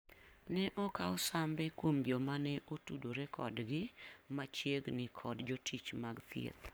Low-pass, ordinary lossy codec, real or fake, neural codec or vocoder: none; none; fake; codec, 44.1 kHz, 7.8 kbps, Pupu-Codec